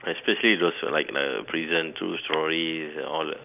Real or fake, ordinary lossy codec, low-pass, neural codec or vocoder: real; none; 3.6 kHz; none